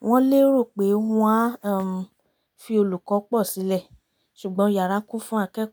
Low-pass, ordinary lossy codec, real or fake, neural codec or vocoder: none; none; real; none